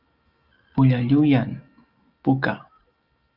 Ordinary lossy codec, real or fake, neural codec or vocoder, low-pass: Opus, 24 kbps; real; none; 5.4 kHz